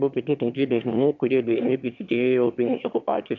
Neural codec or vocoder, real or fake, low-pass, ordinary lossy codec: autoencoder, 22.05 kHz, a latent of 192 numbers a frame, VITS, trained on one speaker; fake; 7.2 kHz; MP3, 64 kbps